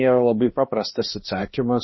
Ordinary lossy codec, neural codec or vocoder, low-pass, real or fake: MP3, 24 kbps; codec, 16 kHz, 1 kbps, X-Codec, HuBERT features, trained on balanced general audio; 7.2 kHz; fake